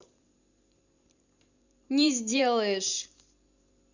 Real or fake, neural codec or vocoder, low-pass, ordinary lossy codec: fake; vocoder, 44.1 kHz, 128 mel bands every 512 samples, BigVGAN v2; 7.2 kHz; none